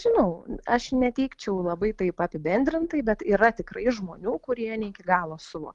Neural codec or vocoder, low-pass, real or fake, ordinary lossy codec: none; 10.8 kHz; real; Opus, 24 kbps